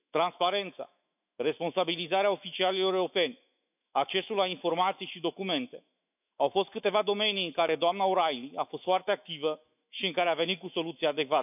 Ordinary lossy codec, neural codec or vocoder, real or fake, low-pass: none; autoencoder, 48 kHz, 128 numbers a frame, DAC-VAE, trained on Japanese speech; fake; 3.6 kHz